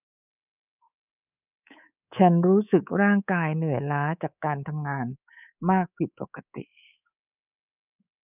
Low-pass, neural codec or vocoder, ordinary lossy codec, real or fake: 3.6 kHz; codec, 24 kHz, 3.1 kbps, DualCodec; none; fake